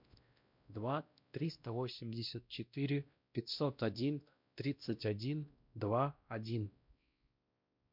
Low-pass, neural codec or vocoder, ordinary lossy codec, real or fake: 5.4 kHz; codec, 16 kHz, 1 kbps, X-Codec, WavLM features, trained on Multilingual LibriSpeech; MP3, 48 kbps; fake